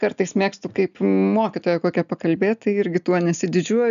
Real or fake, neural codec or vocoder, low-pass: real; none; 7.2 kHz